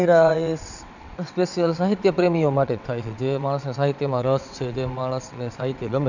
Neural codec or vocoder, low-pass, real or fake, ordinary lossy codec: vocoder, 22.05 kHz, 80 mel bands, WaveNeXt; 7.2 kHz; fake; none